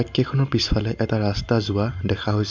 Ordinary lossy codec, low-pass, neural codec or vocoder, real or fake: MP3, 64 kbps; 7.2 kHz; none; real